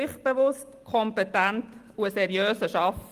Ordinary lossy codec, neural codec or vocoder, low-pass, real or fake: Opus, 24 kbps; none; 14.4 kHz; real